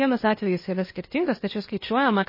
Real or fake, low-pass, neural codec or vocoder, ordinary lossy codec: fake; 5.4 kHz; codec, 16 kHz, 0.8 kbps, ZipCodec; MP3, 24 kbps